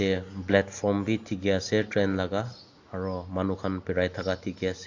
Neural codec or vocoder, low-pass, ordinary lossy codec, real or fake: none; 7.2 kHz; AAC, 48 kbps; real